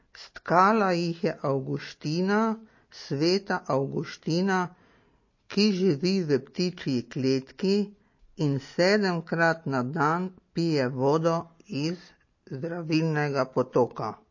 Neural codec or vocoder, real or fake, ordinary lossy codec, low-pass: none; real; MP3, 32 kbps; 7.2 kHz